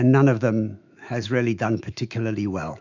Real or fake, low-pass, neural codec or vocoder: fake; 7.2 kHz; autoencoder, 48 kHz, 128 numbers a frame, DAC-VAE, trained on Japanese speech